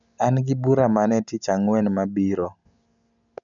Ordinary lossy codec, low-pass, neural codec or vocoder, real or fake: none; 7.2 kHz; none; real